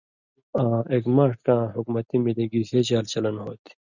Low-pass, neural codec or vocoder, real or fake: 7.2 kHz; none; real